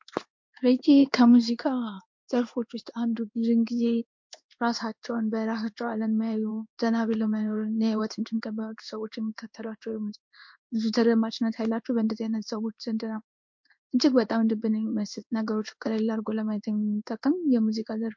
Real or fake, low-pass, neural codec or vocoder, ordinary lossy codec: fake; 7.2 kHz; codec, 16 kHz in and 24 kHz out, 1 kbps, XY-Tokenizer; MP3, 48 kbps